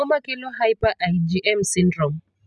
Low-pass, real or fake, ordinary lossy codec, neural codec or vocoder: none; real; none; none